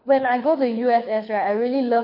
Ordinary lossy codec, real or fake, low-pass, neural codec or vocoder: MP3, 32 kbps; fake; 5.4 kHz; codec, 24 kHz, 6 kbps, HILCodec